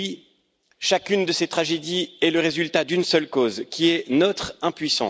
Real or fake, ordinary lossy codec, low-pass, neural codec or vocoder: real; none; none; none